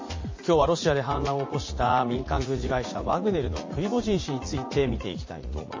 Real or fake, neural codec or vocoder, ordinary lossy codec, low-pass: fake; vocoder, 44.1 kHz, 80 mel bands, Vocos; MP3, 32 kbps; 7.2 kHz